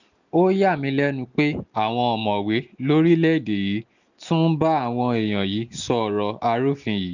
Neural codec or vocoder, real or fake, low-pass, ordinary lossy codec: none; real; 7.2 kHz; none